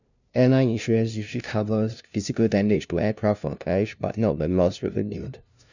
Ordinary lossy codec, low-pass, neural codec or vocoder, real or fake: none; 7.2 kHz; codec, 16 kHz, 0.5 kbps, FunCodec, trained on LibriTTS, 25 frames a second; fake